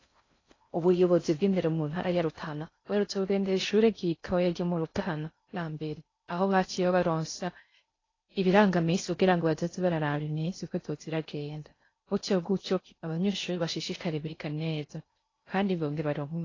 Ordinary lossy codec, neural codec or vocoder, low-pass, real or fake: AAC, 32 kbps; codec, 16 kHz in and 24 kHz out, 0.6 kbps, FocalCodec, streaming, 4096 codes; 7.2 kHz; fake